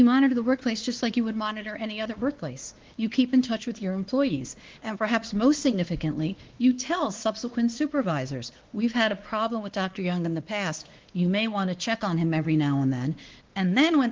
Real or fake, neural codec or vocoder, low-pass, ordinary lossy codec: fake; codec, 16 kHz, 2 kbps, X-Codec, WavLM features, trained on Multilingual LibriSpeech; 7.2 kHz; Opus, 16 kbps